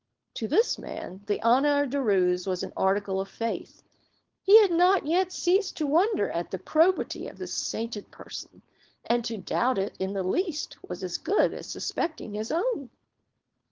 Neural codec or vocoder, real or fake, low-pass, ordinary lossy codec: codec, 16 kHz, 4.8 kbps, FACodec; fake; 7.2 kHz; Opus, 16 kbps